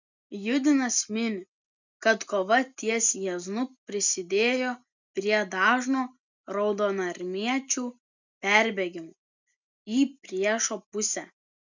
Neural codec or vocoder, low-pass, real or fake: none; 7.2 kHz; real